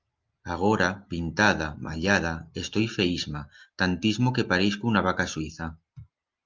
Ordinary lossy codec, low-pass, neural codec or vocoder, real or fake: Opus, 24 kbps; 7.2 kHz; none; real